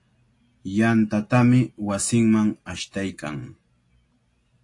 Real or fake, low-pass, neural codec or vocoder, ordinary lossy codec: real; 10.8 kHz; none; AAC, 48 kbps